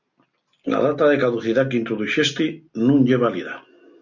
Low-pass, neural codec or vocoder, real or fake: 7.2 kHz; none; real